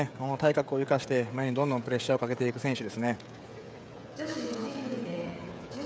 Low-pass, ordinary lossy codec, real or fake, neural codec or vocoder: none; none; fake; codec, 16 kHz, 16 kbps, FreqCodec, smaller model